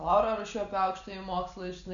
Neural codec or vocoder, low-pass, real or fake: none; 7.2 kHz; real